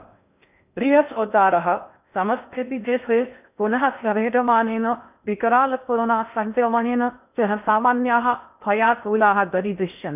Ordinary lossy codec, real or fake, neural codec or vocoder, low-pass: none; fake; codec, 16 kHz in and 24 kHz out, 0.6 kbps, FocalCodec, streaming, 4096 codes; 3.6 kHz